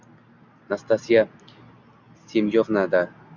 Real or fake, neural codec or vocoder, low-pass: real; none; 7.2 kHz